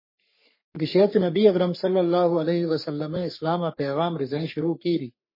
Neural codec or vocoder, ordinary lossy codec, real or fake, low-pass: codec, 44.1 kHz, 3.4 kbps, Pupu-Codec; MP3, 24 kbps; fake; 5.4 kHz